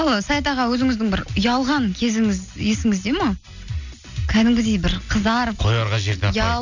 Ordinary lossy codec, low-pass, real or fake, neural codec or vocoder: none; 7.2 kHz; real; none